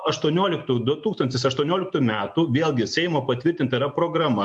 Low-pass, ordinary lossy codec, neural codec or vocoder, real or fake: 10.8 kHz; MP3, 64 kbps; none; real